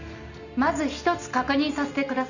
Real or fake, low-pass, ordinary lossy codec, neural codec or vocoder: real; 7.2 kHz; none; none